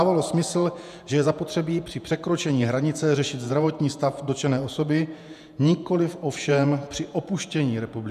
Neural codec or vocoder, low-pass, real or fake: vocoder, 48 kHz, 128 mel bands, Vocos; 14.4 kHz; fake